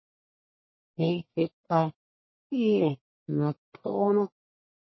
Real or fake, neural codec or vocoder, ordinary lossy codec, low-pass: fake; codec, 44.1 kHz, 1.7 kbps, Pupu-Codec; MP3, 24 kbps; 7.2 kHz